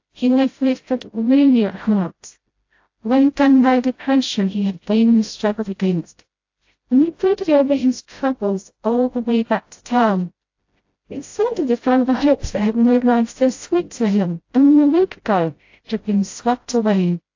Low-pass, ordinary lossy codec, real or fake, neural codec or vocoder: 7.2 kHz; AAC, 48 kbps; fake; codec, 16 kHz, 0.5 kbps, FreqCodec, smaller model